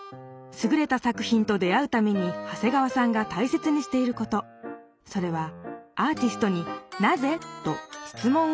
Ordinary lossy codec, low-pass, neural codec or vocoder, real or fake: none; none; none; real